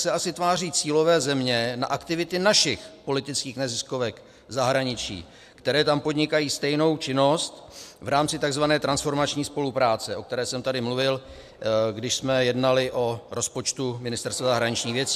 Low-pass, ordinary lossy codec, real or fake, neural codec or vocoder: 14.4 kHz; AAC, 96 kbps; real; none